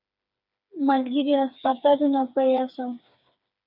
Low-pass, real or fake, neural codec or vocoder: 5.4 kHz; fake; codec, 16 kHz, 4 kbps, FreqCodec, smaller model